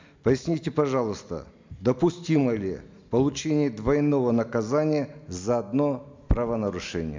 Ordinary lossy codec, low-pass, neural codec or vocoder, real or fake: MP3, 64 kbps; 7.2 kHz; none; real